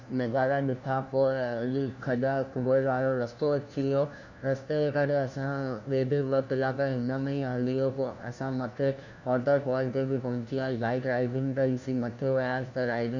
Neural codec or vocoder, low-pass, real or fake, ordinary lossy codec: codec, 16 kHz, 1 kbps, FunCodec, trained on LibriTTS, 50 frames a second; 7.2 kHz; fake; none